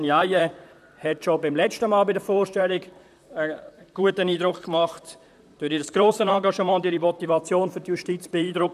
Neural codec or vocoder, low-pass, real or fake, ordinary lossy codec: vocoder, 44.1 kHz, 128 mel bands, Pupu-Vocoder; 14.4 kHz; fake; none